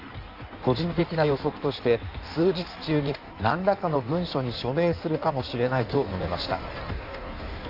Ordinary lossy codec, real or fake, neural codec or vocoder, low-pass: none; fake; codec, 16 kHz in and 24 kHz out, 1.1 kbps, FireRedTTS-2 codec; 5.4 kHz